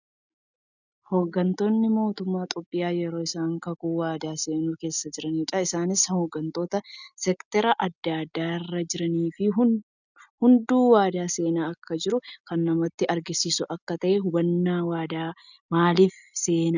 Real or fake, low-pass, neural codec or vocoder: real; 7.2 kHz; none